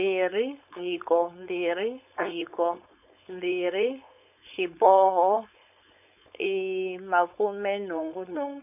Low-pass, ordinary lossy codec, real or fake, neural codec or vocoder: 3.6 kHz; none; fake; codec, 16 kHz, 4.8 kbps, FACodec